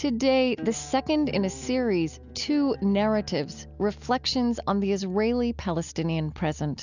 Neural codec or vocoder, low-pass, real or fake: none; 7.2 kHz; real